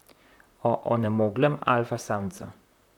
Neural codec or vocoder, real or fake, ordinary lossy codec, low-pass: vocoder, 44.1 kHz, 128 mel bands, Pupu-Vocoder; fake; none; 19.8 kHz